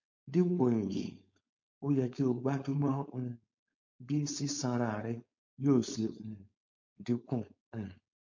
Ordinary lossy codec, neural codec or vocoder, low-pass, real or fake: MP3, 48 kbps; codec, 16 kHz, 4.8 kbps, FACodec; 7.2 kHz; fake